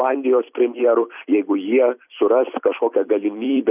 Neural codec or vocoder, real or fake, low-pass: vocoder, 44.1 kHz, 128 mel bands every 256 samples, BigVGAN v2; fake; 3.6 kHz